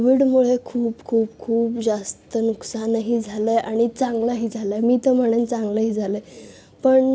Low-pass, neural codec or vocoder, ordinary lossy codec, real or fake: none; none; none; real